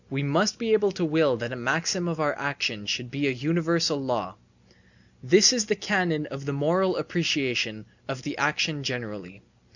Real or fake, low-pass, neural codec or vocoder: real; 7.2 kHz; none